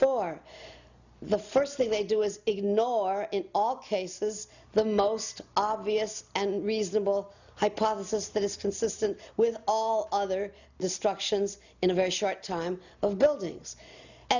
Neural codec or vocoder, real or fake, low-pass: none; real; 7.2 kHz